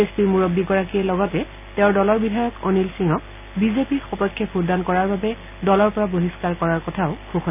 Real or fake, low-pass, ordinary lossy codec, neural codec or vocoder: real; 3.6 kHz; none; none